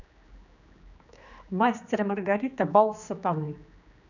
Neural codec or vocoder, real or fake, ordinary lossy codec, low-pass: codec, 16 kHz, 2 kbps, X-Codec, HuBERT features, trained on general audio; fake; none; 7.2 kHz